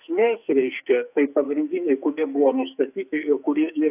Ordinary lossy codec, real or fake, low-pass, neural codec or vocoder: AAC, 32 kbps; fake; 3.6 kHz; codec, 44.1 kHz, 2.6 kbps, SNAC